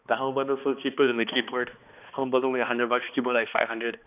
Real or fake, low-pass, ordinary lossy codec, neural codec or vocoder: fake; 3.6 kHz; none; codec, 16 kHz, 2 kbps, X-Codec, HuBERT features, trained on balanced general audio